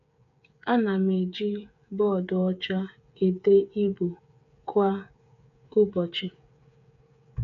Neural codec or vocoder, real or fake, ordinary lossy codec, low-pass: codec, 16 kHz, 8 kbps, FreqCodec, smaller model; fake; none; 7.2 kHz